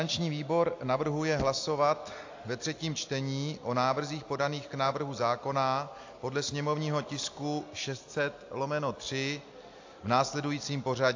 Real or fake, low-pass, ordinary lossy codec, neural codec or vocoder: real; 7.2 kHz; AAC, 48 kbps; none